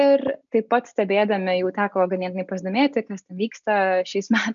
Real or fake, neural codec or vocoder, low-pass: real; none; 7.2 kHz